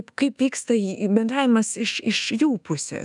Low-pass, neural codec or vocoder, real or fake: 10.8 kHz; codec, 24 kHz, 1.2 kbps, DualCodec; fake